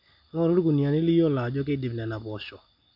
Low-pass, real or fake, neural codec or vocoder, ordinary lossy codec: 5.4 kHz; real; none; MP3, 48 kbps